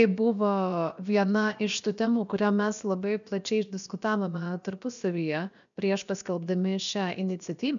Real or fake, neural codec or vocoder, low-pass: fake; codec, 16 kHz, about 1 kbps, DyCAST, with the encoder's durations; 7.2 kHz